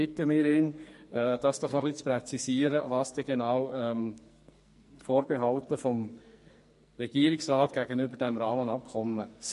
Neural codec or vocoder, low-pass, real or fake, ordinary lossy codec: codec, 44.1 kHz, 2.6 kbps, SNAC; 14.4 kHz; fake; MP3, 48 kbps